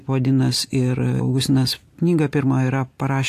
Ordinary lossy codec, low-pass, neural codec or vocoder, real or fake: AAC, 64 kbps; 14.4 kHz; none; real